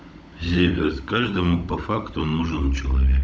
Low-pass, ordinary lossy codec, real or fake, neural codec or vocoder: none; none; fake; codec, 16 kHz, 16 kbps, FunCodec, trained on LibriTTS, 50 frames a second